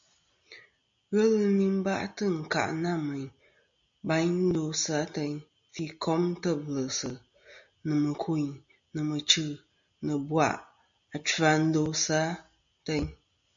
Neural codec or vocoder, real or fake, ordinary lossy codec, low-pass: none; real; MP3, 64 kbps; 7.2 kHz